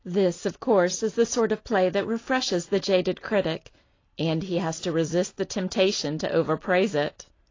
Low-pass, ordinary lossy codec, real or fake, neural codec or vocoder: 7.2 kHz; AAC, 32 kbps; real; none